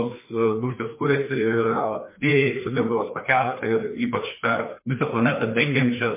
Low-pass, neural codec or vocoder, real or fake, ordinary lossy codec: 3.6 kHz; codec, 16 kHz, 2 kbps, FreqCodec, larger model; fake; MP3, 24 kbps